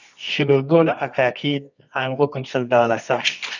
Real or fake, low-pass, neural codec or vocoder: fake; 7.2 kHz; codec, 24 kHz, 0.9 kbps, WavTokenizer, medium music audio release